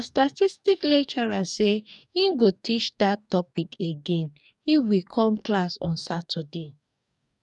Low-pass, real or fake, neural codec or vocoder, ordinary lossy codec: 10.8 kHz; fake; codec, 44.1 kHz, 2.6 kbps, DAC; none